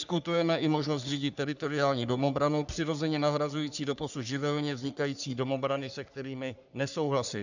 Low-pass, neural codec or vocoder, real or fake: 7.2 kHz; codec, 44.1 kHz, 3.4 kbps, Pupu-Codec; fake